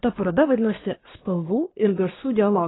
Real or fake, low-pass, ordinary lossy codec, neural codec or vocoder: fake; 7.2 kHz; AAC, 16 kbps; codec, 24 kHz, 1 kbps, SNAC